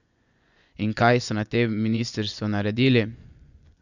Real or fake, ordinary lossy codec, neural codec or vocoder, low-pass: fake; none; vocoder, 24 kHz, 100 mel bands, Vocos; 7.2 kHz